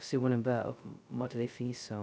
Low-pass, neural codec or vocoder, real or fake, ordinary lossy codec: none; codec, 16 kHz, 0.2 kbps, FocalCodec; fake; none